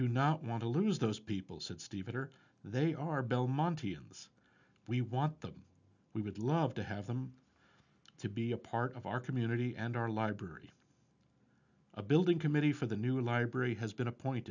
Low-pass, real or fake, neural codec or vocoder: 7.2 kHz; real; none